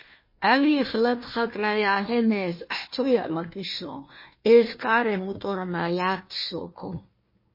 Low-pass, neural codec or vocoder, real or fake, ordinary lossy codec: 5.4 kHz; codec, 16 kHz, 1 kbps, FunCodec, trained on Chinese and English, 50 frames a second; fake; MP3, 24 kbps